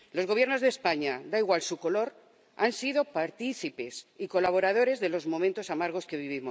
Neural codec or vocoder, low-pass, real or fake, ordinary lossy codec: none; none; real; none